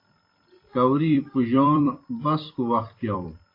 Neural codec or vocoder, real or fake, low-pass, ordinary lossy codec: vocoder, 44.1 kHz, 128 mel bands every 256 samples, BigVGAN v2; fake; 5.4 kHz; AAC, 32 kbps